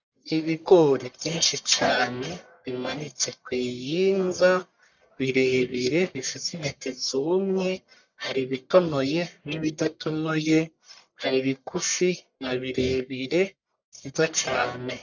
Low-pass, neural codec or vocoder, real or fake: 7.2 kHz; codec, 44.1 kHz, 1.7 kbps, Pupu-Codec; fake